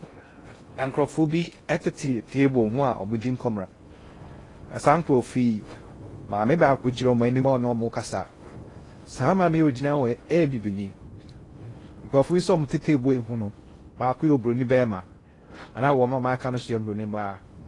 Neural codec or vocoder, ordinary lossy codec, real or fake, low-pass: codec, 16 kHz in and 24 kHz out, 0.6 kbps, FocalCodec, streaming, 2048 codes; AAC, 32 kbps; fake; 10.8 kHz